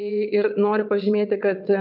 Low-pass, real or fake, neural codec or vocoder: 5.4 kHz; fake; vocoder, 22.05 kHz, 80 mel bands, WaveNeXt